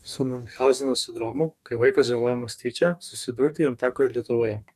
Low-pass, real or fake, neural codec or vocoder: 14.4 kHz; fake; codec, 44.1 kHz, 2.6 kbps, DAC